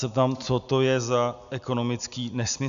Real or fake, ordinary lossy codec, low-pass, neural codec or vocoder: real; MP3, 96 kbps; 7.2 kHz; none